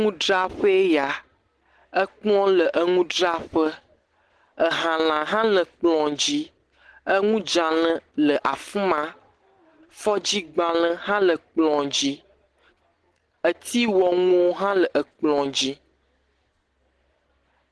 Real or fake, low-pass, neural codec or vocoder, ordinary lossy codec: real; 10.8 kHz; none; Opus, 16 kbps